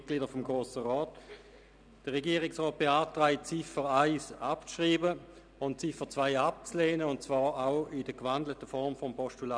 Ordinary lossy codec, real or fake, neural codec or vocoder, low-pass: none; real; none; 9.9 kHz